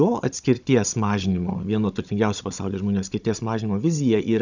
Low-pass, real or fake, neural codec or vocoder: 7.2 kHz; fake; codec, 16 kHz, 16 kbps, FunCodec, trained on Chinese and English, 50 frames a second